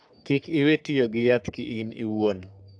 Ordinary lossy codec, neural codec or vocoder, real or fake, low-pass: AAC, 64 kbps; codec, 44.1 kHz, 3.4 kbps, Pupu-Codec; fake; 9.9 kHz